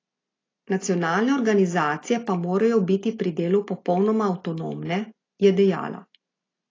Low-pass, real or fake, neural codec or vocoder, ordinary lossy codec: 7.2 kHz; real; none; AAC, 32 kbps